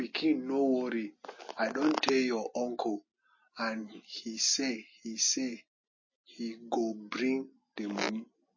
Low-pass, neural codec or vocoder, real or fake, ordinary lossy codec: 7.2 kHz; none; real; MP3, 32 kbps